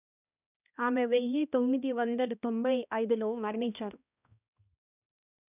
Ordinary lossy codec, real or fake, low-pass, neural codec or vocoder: none; fake; 3.6 kHz; codec, 16 kHz, 1 kbps, X-Codec, HuBERT features, trained on balanced general audio